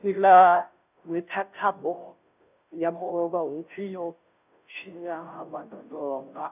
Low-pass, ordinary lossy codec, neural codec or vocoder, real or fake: 3.6 kHz; none; codec, 16 kHz, 0.5 kbps, FunCodec, trained on Chinese and English, 25 frames a second; fake